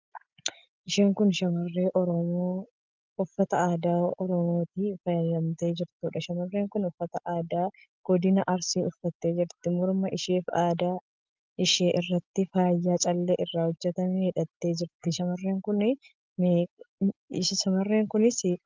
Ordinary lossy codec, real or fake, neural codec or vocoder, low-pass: Opus, 24 kbps; real; none; 7.2 kHz